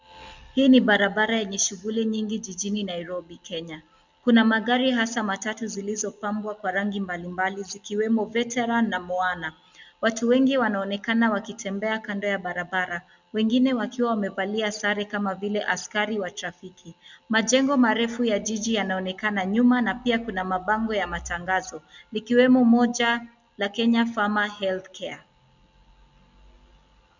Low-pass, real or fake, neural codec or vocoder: 7.2 kHz; real; none